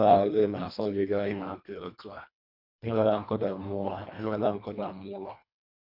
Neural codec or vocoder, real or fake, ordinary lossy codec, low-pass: codec, 24 kHz, 1.5 kbps, HILCodec; fake; MP3, 48 kbps; 5.4 kHz